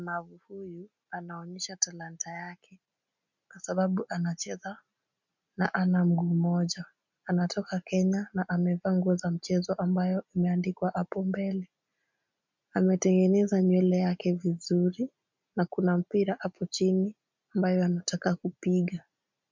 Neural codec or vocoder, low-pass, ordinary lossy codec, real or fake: none; 7.2 kHz; MP3, 64 kbps; real